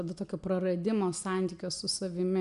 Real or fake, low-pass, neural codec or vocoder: real; 10.8 kHz; none